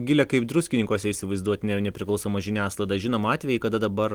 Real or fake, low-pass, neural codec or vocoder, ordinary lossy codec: real; 19.8 kHz; none; Opus, 24 kbps